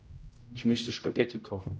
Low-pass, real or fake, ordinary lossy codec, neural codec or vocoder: none; fake; none; codec, 16 kHz, 0.5 kbps, X-Codec, HuBERT features, trained on general audio